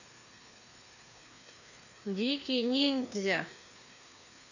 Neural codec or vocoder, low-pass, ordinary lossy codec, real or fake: codec, 16 kHz, 2 kbps, FreqCodec, larger model; 7.2 kHz; none; fake